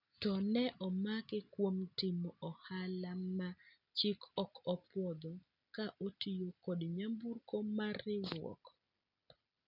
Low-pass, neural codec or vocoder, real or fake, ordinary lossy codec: 5.4 kHz; none; real; none